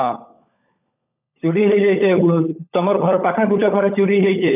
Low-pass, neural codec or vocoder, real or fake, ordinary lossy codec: 3.6 kHz; codec, 16 kHz, 16 kbps, FunCodec, trained on LibriTTS, 50 frames a second; fake; none